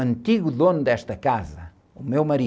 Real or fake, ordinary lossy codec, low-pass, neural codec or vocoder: real; none; none; none